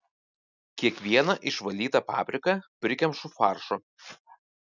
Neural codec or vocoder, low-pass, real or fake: none; 7.2 kHz; real